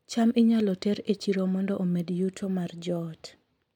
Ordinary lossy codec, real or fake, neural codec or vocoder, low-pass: MP3, 96 kbps; real; none; 19.8 kHz